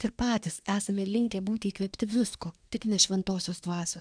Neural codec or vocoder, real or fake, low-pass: codec, 24 kHz, 1 kbps, SNAC; fake; 9.9 kHz